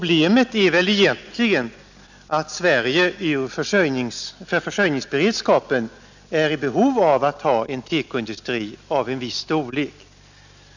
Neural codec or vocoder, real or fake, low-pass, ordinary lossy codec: none; real; 7.2 kHz; none